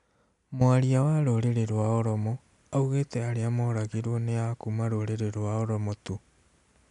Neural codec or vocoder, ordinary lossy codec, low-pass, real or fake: none; none; 10.8 kHz; real